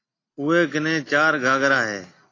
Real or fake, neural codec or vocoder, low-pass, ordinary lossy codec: real; none; 7.2 kHz; AAC, 32 kbps